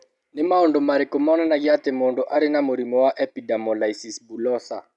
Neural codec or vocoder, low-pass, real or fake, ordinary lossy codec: none; 10.8 kHz; real; none